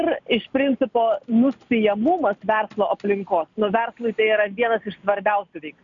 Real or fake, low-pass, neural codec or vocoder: real; 7.2 kHz; none